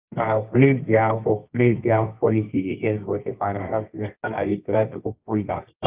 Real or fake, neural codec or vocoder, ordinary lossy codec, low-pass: fake; codec, 24 kHz, 0.9 kbps, WavTokenizer, medium music audio release; Opus, 24 kbps; 3.6 kHz